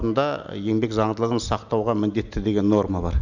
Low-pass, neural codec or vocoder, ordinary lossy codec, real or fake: 7.2 kHz; none; none; real